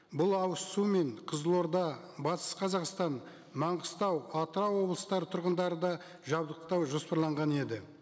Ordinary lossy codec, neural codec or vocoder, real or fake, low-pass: none; none; real; none